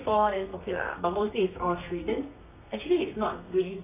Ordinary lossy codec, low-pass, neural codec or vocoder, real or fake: none; 3.6 kHz; codec, 32 kHz, 1.9 kbps, SNAC; fake